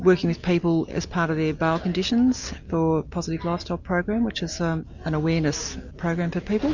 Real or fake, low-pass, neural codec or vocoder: real; 7.2 kHz; none